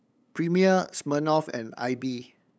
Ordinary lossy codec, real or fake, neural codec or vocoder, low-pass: none; fake; codec, 16 kHz, 8 kbps, FunCodec, trained on LibriTTS, 25 frames a second; none